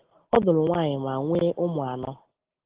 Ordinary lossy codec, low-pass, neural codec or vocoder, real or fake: Opus, 16 kbps; 3.6 kHz; none; real